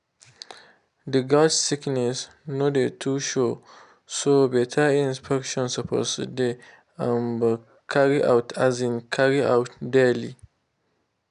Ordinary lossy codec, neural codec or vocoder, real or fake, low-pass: none; none; real; 10.8 kHz